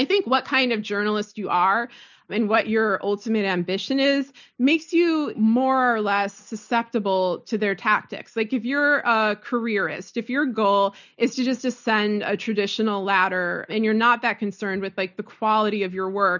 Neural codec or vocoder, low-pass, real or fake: none; 7.2 kHz; real